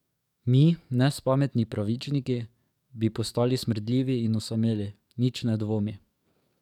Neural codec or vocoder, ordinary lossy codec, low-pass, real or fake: codec, 44.1 kHz, 7.8 kbps, DAC; none; 19.8 kHz; fake